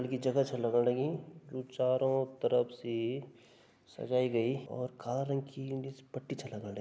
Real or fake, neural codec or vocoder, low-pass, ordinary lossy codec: real; none; none; none